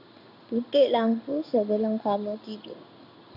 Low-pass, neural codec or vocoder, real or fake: 5.4 kHz; codec, 16 kHz in and 24 kHz out, 1 kbps, XY-Tokenizer; fake